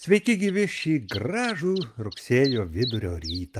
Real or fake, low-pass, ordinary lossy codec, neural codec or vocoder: real; 14.4 kHz; Opus, 32 kbps; none